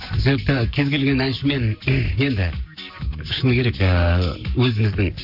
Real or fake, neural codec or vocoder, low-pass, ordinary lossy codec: fake; codec, 44.1 kHz, 7.8 kbps, Pupu-Codec; 5.4 kHz; AAC, 48 kbps